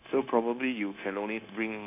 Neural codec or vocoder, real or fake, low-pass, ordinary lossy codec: codec, 16 kHz, 0.9 kbps, LongCat-Audio-Codec; fake; 3.6 kHz; none